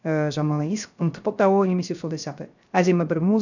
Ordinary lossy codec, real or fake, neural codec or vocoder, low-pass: none; fake; codec, 16 kHz, 0.3 kbps, FocalCodec; 7.2 kHz